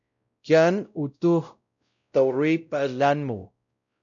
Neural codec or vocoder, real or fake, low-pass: codec, 16 kHz, 0.5 kbps, X-Codec, WavLM features, trained on Multilingual LibriSpeech; fake; 7.2 kHz